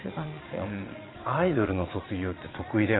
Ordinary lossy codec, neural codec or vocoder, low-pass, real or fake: AAC, 16 kbps; vocoder, 22.05 kHz, 80 mel bands, Vocos; 7.2 kHz; fake